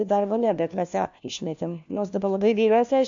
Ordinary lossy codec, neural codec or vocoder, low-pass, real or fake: MP3, 64 kbps; codec, 16 kHz, 1 kbps, FunCodec, trained on LibriTTS, 50 frames a second; 7.2 kHz; fake